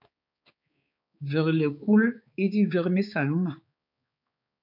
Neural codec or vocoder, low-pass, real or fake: codec, 16 kHz, 4 kbps, X-Codec, HuBERT features, trained on balanced general audio; 5.4 kHz; fake